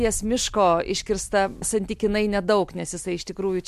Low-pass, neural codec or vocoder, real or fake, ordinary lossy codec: 14.4 kHz; none; real; MP3, 64 kbps